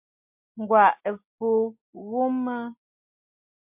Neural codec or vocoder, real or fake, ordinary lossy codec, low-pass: none; real; MP3, 32 kbps; 3.6 kHz